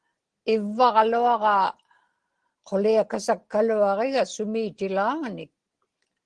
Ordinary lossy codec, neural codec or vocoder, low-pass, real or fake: Opus, 16 kbps; none; 9.9 kHz; real